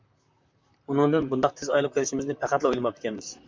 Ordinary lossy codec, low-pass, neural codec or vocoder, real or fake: MP3, 64 kbps; 7.2 kHz; vocoder, 44.1 kHz, 128 mel bands, Pupu-Vocoder; fake